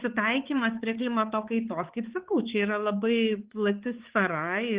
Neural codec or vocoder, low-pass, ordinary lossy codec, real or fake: codec, 16 kHz, 4 kbps, X-Codec, HuBERT features, trained on balanced general audio; 3.6 kHz; Opus, 16 kbps; fake